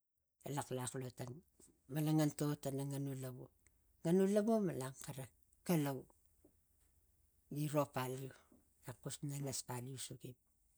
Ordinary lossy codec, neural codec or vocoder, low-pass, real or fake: none; none; none; real